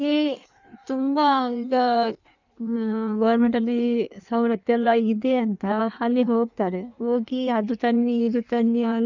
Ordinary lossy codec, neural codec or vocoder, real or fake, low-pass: none; codec, 16 kHz in and 24 kHz out, 1.1 kbps, FireRedTTS-2 codec; fake; 7.2 kHz